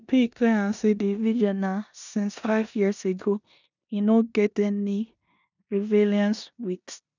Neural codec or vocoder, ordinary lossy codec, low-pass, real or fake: codec, 16 kHz in and 24 kHz out, 0.9 kbps, LongCat-Audio-Codec, four codebook decoder; none; 7.2 kHz; fake